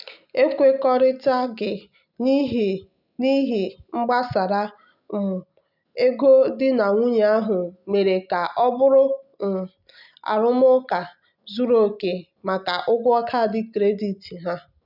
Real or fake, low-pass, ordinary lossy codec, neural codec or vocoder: real; 5.4 kHz; none; none